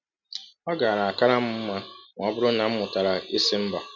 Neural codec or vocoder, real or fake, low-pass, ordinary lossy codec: none; real; 7.2 kHz; none